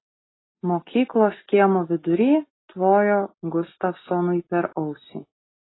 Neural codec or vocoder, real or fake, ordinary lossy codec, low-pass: none; real; AAC, 16 kbps; 7.2 kHz